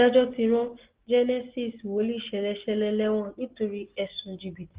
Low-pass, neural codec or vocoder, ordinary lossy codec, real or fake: 3.6 kHz; none; Opus, 16 kbps; real